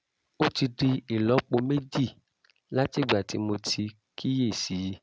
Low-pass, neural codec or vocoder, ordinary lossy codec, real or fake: none; none; none; real